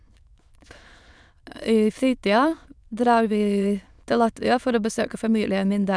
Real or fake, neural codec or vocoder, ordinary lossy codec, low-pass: fake; autoencoder, 22.05 kHz, a latent of 192 numbers a frame, VITS, trained on many speakers; none; none